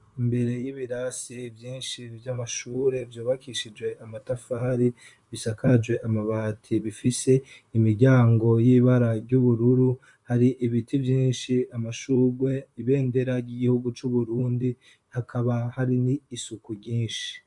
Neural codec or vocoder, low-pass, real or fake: vocoder, 44.1 kHz, 128 mel bands, Pupu-Vocoder; 10.8 kHz; fake